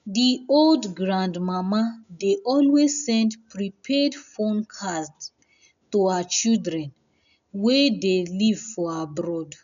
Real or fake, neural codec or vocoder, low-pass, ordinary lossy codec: real; none; 7.2 kHz; none